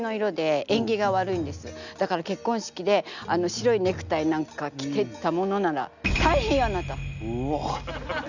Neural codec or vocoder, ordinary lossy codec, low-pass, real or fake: none; none; 7.2 kHz; real